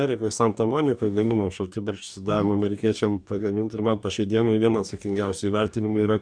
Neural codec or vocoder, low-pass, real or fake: codec, 44.1 kHz, 2.6 kbps, DAC; 9.9 kHz; fake